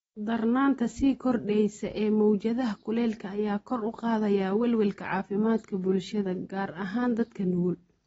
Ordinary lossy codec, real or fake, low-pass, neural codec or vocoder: AAC, 24 kbps; real; 19.8 kHz; none